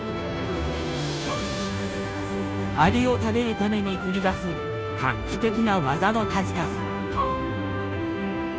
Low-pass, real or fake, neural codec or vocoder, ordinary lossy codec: none; fake; codec, 16 kHz, 0.5 kbps, FunCodec, trained on Chinese and English, 25 frames a second; none